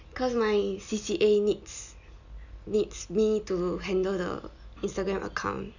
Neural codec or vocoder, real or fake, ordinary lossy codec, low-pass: none; real; none; 7.2 kHz